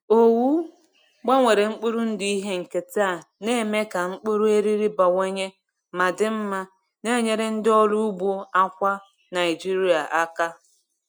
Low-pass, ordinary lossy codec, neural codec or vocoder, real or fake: 19.8 kHz; none; none; real